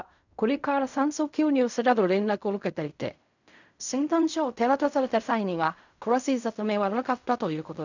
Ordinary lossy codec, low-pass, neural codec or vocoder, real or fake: none; 7.2 kHz; codec, 16 kHz in and 24 kHz out, 0.4 kbps, LongCat-Audio-Codec, fine tuned four codebook decoder; fake